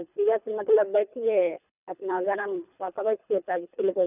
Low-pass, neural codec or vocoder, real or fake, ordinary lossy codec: 3.6 kHz; codec, 24 kHz, 3 kbps, HILCodec; fake; none